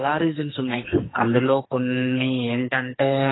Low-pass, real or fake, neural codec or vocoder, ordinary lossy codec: 7.2 kHz; fake; codec, 44.1 kHz, 2.6 kbps, SNAC; AAC, 16 kbps